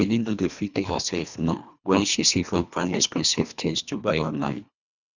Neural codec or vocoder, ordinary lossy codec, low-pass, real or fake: codec, 24 kHz, 1.5 kbps, HILCodec; none; 7.2 kHz; fake